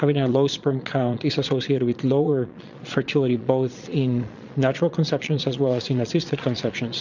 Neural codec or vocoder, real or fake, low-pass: vocoder, 22.05 kHz, 80 mel bands, Vocos; fake; 7.2 kHz